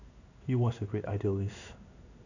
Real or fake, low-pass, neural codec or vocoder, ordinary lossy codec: fake; 7.2 kHz; codec, 16 kHz in and 24 kHz out, 1 kbps, XY-Tokenizer; none